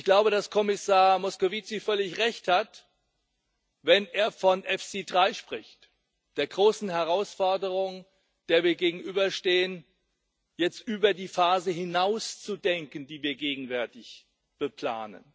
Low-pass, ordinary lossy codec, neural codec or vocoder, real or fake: none; none; none; real